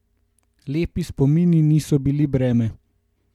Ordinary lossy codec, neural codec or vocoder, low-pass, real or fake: MP3, 96 kbps; none; 19.8 kHz; real